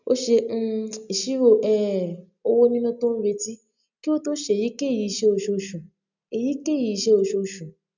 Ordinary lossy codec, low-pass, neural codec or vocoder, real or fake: none; 7.2 kHz; none; real